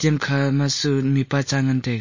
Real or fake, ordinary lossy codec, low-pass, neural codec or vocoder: real; MP3, 32 kbps; 7.2 kHz; none